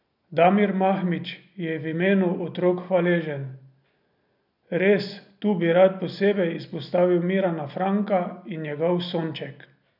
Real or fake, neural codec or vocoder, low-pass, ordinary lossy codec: real; none; 5.4 kHz; none